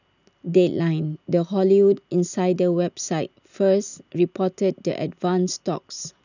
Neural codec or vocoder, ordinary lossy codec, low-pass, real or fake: none; none; 7.2 kHz; real